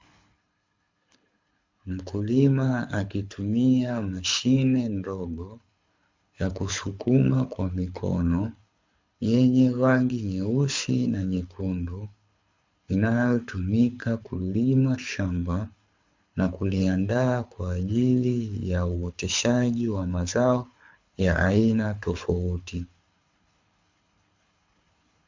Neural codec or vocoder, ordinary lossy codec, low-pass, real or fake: codec, 24 kHz, 6 kbps, HILCodec; MP3, 64 kbps; 7.2 kHz; fake